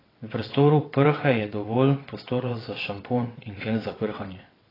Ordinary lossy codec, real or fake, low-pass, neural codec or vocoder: AAC, 24 kbps; fake; 5.4 kHz; vocoder, 22.05 kHz, 80 mel bands, WaveNeXt